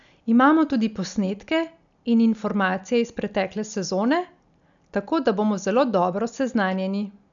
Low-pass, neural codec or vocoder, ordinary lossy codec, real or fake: 7.2 kHz; none; none; real